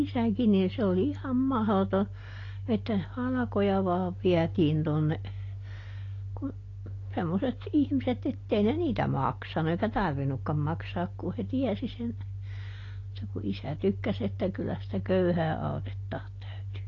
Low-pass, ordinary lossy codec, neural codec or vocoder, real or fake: 7.2 kHz; AAC, 32 kbps; none; real